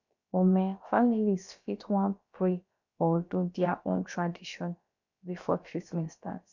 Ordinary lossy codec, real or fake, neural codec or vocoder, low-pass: none; fake; codec, 16 kHz, about 1 kbps, DyCAST, with the encoder's durations; 7.2 kHz